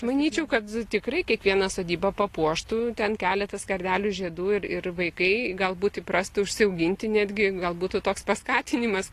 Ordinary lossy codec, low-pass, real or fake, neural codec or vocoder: AAC, 48 kbps; 14.4 kHz; real; none